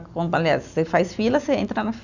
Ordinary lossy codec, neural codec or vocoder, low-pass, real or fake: none; none; 7.2 kHz; real